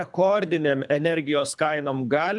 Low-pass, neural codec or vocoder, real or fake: 10.8 kHz; codec, 24 kHz, 3 kbps, HILCodec; fake